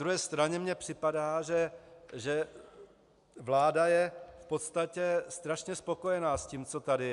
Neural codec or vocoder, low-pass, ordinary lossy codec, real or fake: none; 10.8 kHz; AAC, 96 kbps; real